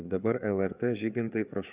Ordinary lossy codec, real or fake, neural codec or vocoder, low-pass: Opus, 64 kbps; fake; codec, 16 kHz, 4 kbps, FreqCodec, larger model; 3.6 kHz